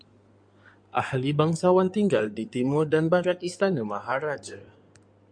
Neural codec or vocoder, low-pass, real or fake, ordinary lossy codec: codec, 16 kHz in and 24 kHz out, 2.2 kbps, FireRedTTS-2 codec; 9.9 kHz; fake; MP3, 64 kbps